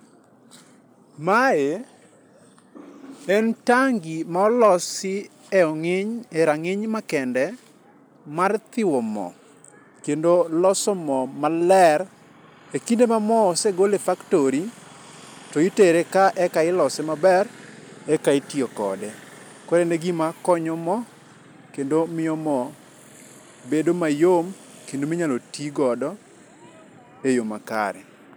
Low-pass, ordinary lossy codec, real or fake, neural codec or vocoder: none; none; real; none